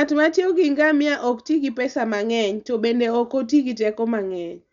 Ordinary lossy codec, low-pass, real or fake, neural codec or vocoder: none; 7.2 kHz; real; none